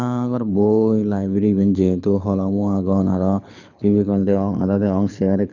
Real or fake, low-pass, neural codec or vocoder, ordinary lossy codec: fake; 7.2 kHz; codec, 24 kHz, 6 kbps, HILCodec; none